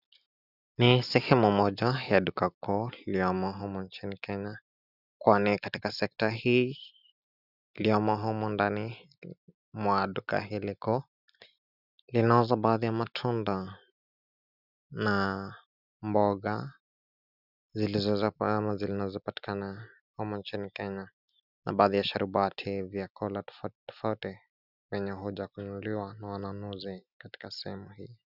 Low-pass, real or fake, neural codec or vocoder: 5.4 kHz; real; none